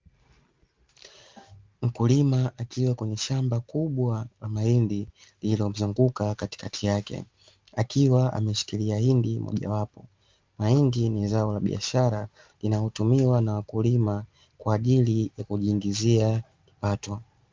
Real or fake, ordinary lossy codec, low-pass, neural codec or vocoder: real; Opus, 24 kbps; 7.2 kHz; none